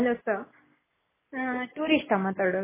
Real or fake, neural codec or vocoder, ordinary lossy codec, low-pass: real; none; MP3, 16 kbps; 3.6 kHz